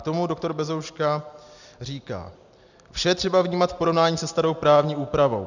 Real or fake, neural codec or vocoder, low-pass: real; none; 7.2 kHz